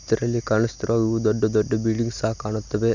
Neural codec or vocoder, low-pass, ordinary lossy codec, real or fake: none; 7.2 kHz; none; real